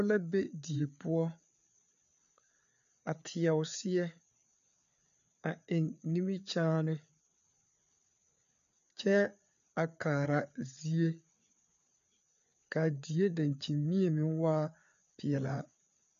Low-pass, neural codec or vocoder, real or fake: 7.2 kHz; codec, 16 kHz, 4 kbps, FreqCodec, larger model; fake